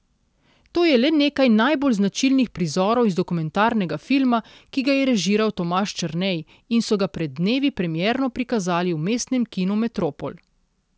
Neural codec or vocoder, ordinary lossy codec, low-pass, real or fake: none; none; none; real